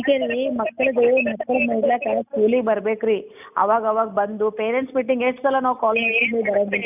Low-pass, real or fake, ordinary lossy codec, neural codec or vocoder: 3.6 kHz; real; none; none